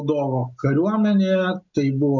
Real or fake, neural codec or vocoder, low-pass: real; none; 7.2 kHz